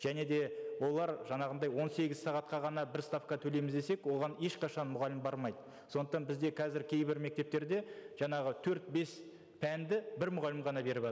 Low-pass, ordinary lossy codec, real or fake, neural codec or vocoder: none; none; real; none